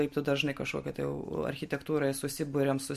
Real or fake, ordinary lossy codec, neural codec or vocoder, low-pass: real; MP3, 64 kbps; none; 14.4 kHz